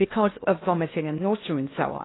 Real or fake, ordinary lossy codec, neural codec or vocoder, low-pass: fake; AAC, 16 kbps; codec, 16 kHz in and 24 kHz out, 0.6 kbps, FocalCodec, streaming, 2048 codes; 7.2 kHz